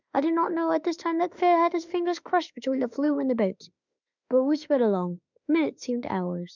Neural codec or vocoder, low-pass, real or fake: autoencoder, 48 kHz, 32 numbers a frame, DAC-VAE, trained on Japanese speech; 7.2 kHz; fake